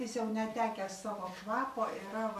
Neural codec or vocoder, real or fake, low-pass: none; real; 14.4 kHz